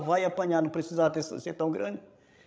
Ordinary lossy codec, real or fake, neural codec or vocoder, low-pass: none; fake; codec, 16 kHz, 16 kbps, FreqCodec, larger model; none